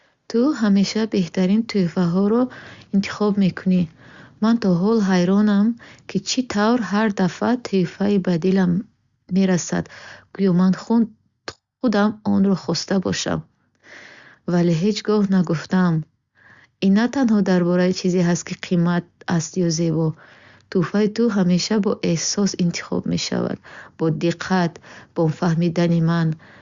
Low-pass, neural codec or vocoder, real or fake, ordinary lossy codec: 7.2 kHz; none; real; Opus, 64 kbps